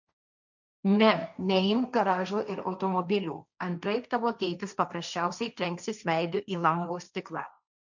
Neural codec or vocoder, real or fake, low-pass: codec, 16 kHz, 1.1 kbps, Voila-Tokenizer; fake; 7.2 kHz